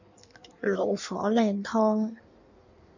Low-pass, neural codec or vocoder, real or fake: 7.2 kHz; codec, 16 kHz in and 24 kHz out, 1.1 kbps, FireRedTTS-2 codec; fake